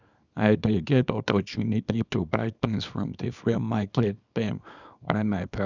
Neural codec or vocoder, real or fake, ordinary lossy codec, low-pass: codec, 24 kHz, 0.9 kbps, WavTokenizer, small release; fake; none; 7.2 kHz